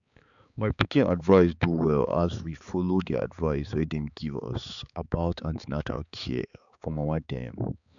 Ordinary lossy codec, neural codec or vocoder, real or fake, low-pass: none; codec, 16 kHz, 4 kbps, X-Codec, HuBERT features, trained on balanced general audio; fake; 7.2 kHz